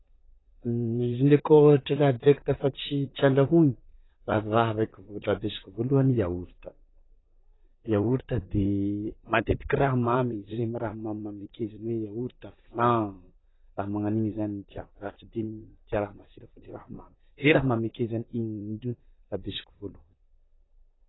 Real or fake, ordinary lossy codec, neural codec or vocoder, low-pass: fake; AAC, 16 kbps; vocoder, 44.1 kHz, 128 mel bands, Pupu-Vocoder; 7.2 kHz